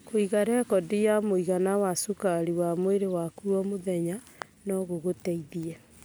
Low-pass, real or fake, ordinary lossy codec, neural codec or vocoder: none; real; none; none